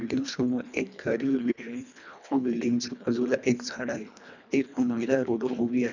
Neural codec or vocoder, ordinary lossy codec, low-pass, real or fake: codec, 24 kHz, 1.5 kbps, HILCodec; none; 7.2 kHz; fake